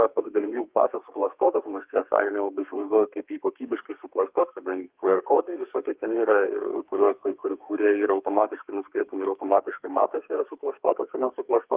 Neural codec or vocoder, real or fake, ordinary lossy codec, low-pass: codec, 32 kHz, 1.9 kbps, SNAC; fake; Opus, 32 kbps; 3.6 kHz